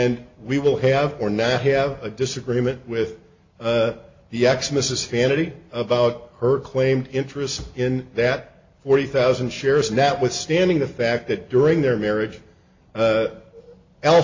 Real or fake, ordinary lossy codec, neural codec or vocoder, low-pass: real; MP3, 48 kbps; none; 7.2 kHz